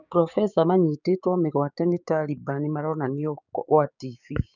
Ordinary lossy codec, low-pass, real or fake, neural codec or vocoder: none; 7.2 kHz; fake; codec, 16 kHz, 6 kbps, DAC